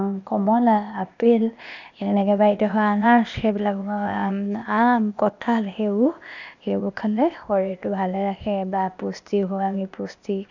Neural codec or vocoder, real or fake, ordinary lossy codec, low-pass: codec, 16 kHz, 0.8 kbps, ZipCodec; fake; none; 7.2 kHz